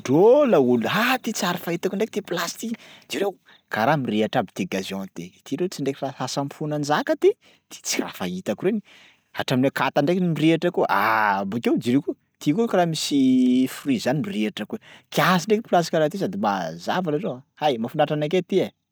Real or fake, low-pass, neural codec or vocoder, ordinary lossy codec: real; none; none; none